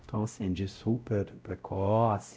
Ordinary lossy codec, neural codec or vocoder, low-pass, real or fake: none; codec, 16 kHz, 0.5 kbps, X-Codec, WavLM features, trained on Multilingual LibriSpeech; none; fake